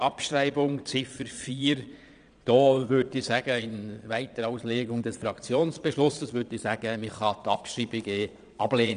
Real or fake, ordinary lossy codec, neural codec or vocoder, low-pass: fake; none; vocoder, 22.05 kHz, 80 mel bands, Vocos; 9.9 kHz